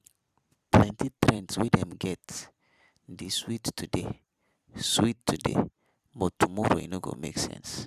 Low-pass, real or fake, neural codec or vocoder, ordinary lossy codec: 14.4 kHz; fake; vocoder, 44.1 kHz, 128 mel bands every 256 samples, BigVGAN v2; none